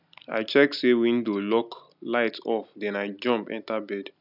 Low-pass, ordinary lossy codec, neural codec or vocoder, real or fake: 5.4 kHz; none; none; real